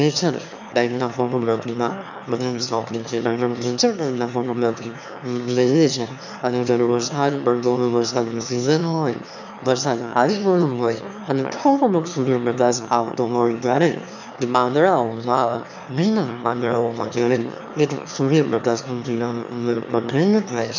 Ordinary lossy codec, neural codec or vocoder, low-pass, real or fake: none; autoencoder, 22.05 kHz, a latent of 192 numbers a frame, VITS, trained on one speaker; 7.2 kHz; fake